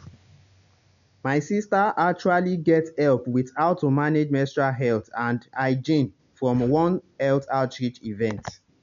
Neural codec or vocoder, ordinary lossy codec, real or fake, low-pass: none; none; real; 7.2 kHz